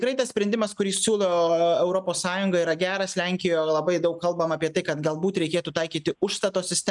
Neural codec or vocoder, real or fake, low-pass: none; real; 10.8 kHz